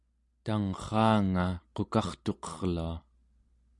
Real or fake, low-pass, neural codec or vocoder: real; 10.8 kHz; none